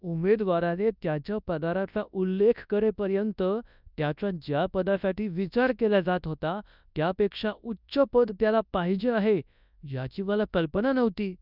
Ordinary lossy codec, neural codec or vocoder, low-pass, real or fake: none; codec, 24 kHz, 0.9 kbps, WavTokenizer, large speech release; 5.4 kHz; fake